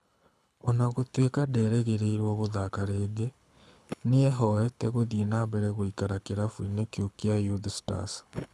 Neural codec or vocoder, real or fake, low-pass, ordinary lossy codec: codec, 24 kHz, 6 kbps, HILCodec; fake; none; none